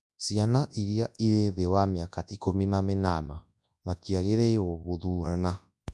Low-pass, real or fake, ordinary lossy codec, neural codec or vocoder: none; fake; none; codec, 24 kHz, 0.9 kbps, WavTokenizer, large speech release